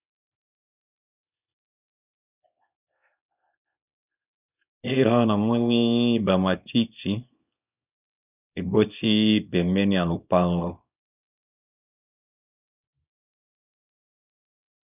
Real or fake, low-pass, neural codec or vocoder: fake; 3.6 kHz; codec, 24 kHz, 0.9 kbps, WavTokenizer, small release